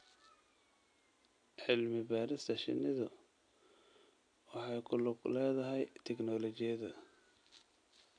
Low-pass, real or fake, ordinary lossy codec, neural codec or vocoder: 9.9 kHz; real; AAC, 48 kbps; none